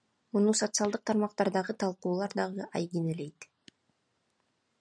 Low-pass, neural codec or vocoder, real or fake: 9.9 kHz; none; real